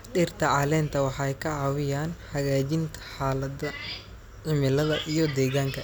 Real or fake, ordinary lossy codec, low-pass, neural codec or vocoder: real; none; none; none